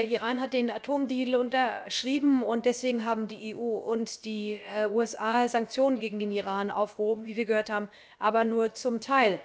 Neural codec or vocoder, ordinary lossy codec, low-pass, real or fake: codec, 16 kHz, about 1 kbps, DyCAST, with the encoder's durations; none; none; fake